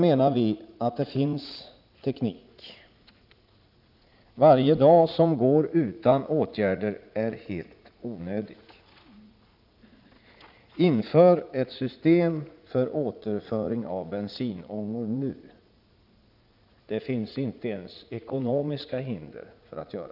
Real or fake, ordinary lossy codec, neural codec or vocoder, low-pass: fake; none; vocoder, 44.1 kHz, 80 mel bands, Vocos; 5.4 kHz